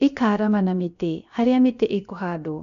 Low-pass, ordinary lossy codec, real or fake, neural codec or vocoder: 7.2 kHz; none; fake; codec, 16 kHz, about 1 kbps, DyCAST, with the encoder's durations